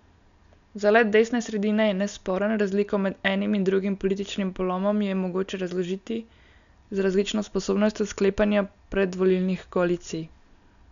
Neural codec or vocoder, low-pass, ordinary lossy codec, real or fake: none; 7.2 kHz; none; real